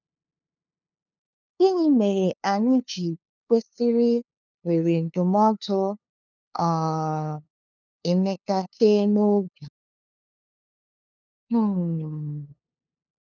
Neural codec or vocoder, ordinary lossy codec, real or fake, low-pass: codec, 16 kHz, 2 kbps, FunCodec, trained on LibriTTS, 25 frames a second; none; fake; 7.2 kHz